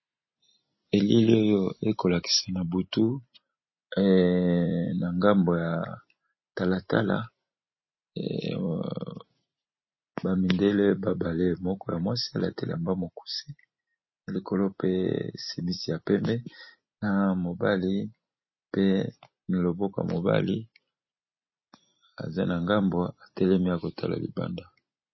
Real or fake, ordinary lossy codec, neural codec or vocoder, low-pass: real; MP3, 24 kbps; none; 7.2 kHz